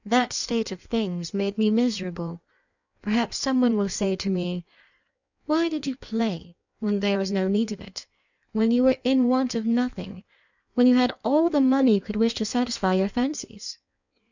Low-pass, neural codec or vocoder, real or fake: 7.2 kHz; codec, 16 kHz in and 24 kHz out, 1.1 kbps, FireRedTTS-2 codec; fake